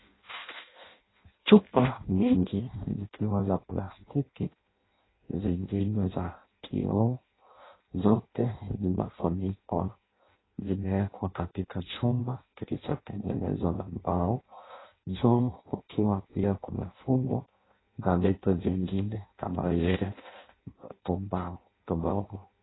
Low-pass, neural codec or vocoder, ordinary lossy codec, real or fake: 7.2 kHz; codec, 16 kHz in and 24 kHz out, 0.6 kbps, FireRedTTS-2 codec; AAC, 16 kbps; fake